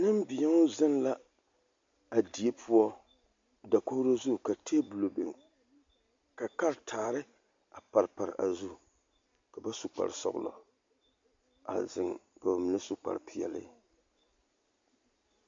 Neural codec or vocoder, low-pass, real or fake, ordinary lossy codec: none; 7.2 kHz; real; MP3, 48 kbps